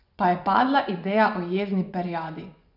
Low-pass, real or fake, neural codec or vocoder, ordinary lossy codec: 5.4 kHz; fake; vocoder, 24 kHz, 100 mel bands, Vocos; none